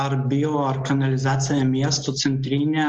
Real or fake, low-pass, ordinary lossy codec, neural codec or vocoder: real; 7.2 kHz; Opus, 24 kbps; none